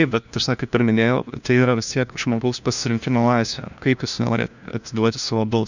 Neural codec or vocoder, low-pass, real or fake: codec, 16 kHz, 1 kbps, FunCodec, trained on LibriTTS, 50 frames a second; 7.2 kHz; fake